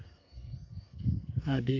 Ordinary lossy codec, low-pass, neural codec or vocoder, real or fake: MP3, 48 kbps; 7.2 kHz; codec, 32 kHz, 1.9 kbps, SNAC; fake